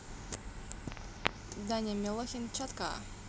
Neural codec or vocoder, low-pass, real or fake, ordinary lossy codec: none; none; real; none